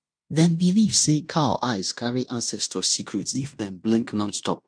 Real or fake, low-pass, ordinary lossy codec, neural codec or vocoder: fake; 9.9 kHz; none; codec, 16 kHz in and 24 kHz out, 0.9 kbps, LongCat-Audio-Codec, fine tuned four codebook decoder